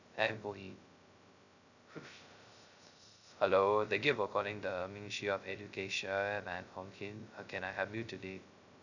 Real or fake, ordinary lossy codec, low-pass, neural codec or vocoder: fake; none; 7.2 kHz; codec, 16 kHz, 0.2 kbps, FocalCodec